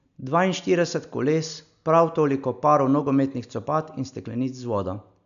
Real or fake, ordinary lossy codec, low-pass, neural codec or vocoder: real; none; 7.2 kHz; none